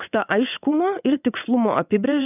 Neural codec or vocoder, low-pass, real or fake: vocoder, 22.05 kHz, 80 mel bands, WaveNeXt; 3.6 kHz; fake